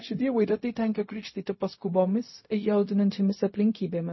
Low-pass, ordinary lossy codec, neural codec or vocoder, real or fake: 7.2 kHz; MP3, 24 kbps; codec, 16 kHz, 0.4 kbps, LongCat-Audio-Codec; fake